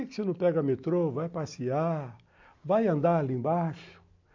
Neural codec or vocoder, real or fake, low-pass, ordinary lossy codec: none; real; 7.2 kHz; AAC, 48 kbps